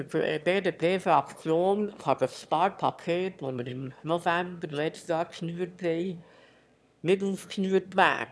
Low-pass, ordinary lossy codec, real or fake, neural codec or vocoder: none; none; fake; autoencoder, 22.05 kHz, a latent of 192 numbers a frame, VITS, trained on one speaker